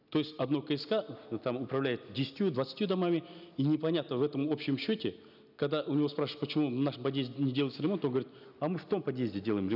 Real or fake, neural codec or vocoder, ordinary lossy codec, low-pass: real; none; none; 5.4 kHz